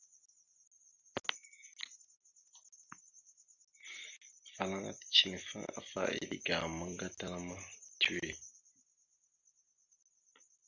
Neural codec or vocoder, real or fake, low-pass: none; real; 7.2 kHz